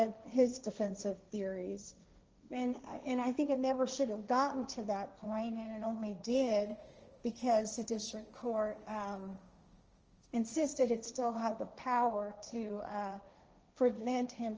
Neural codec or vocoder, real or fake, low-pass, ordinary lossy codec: codec, 16 kHz, 1.1 kbps, Voila-Tokenizer; fake; 7.2 kHz; Opus, 24 kbps